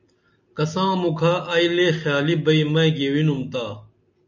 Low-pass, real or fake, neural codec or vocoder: 7.2 kHz; real; none